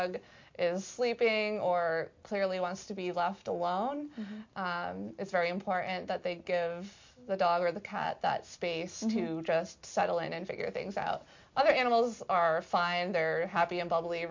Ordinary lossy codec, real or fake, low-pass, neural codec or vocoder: MP3, 48 kbps; real; 7.2 kHz; none